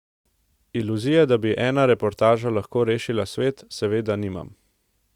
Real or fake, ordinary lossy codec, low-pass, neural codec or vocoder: real; Opus, 64 kbps; 19.8 kHz; none